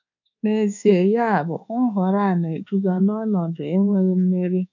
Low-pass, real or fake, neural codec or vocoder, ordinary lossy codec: 7.2 kHz; fake; codec, 24 kHz, 1.2 kbps, DualCodec; none